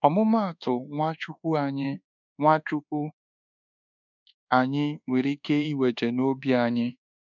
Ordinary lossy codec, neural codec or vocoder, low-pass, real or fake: none; codec, 24 kHz, 1.2 kbps, DualCodec; 7.2 kHz; fake